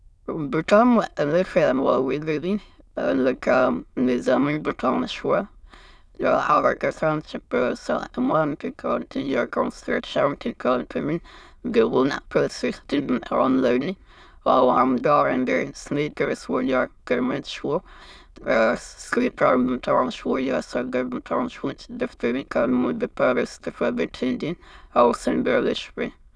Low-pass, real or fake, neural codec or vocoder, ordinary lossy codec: none; fake; autoencoder, 22.05 kHz, a latent of 192 numbers a frame, VITS, trained on many speakers; none